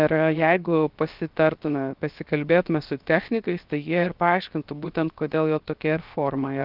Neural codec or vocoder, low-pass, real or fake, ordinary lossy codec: codec, 16 kHz, 0.7 kbps, FocalCodec; 5.4 kHz; fake; Opus, 32 kbps